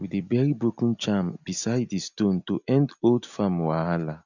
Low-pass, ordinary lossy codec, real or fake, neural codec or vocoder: 7.2 kHz; none; real; none